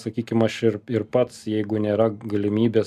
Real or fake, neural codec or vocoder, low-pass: real; none; 14.4 kHz